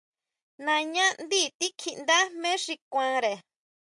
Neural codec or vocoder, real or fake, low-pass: none; real; 10.8 kHz